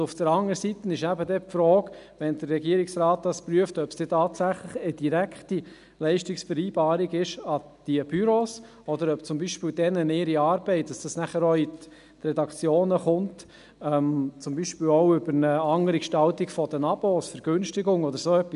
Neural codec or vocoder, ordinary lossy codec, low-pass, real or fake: none; none; 10.8 kHz; real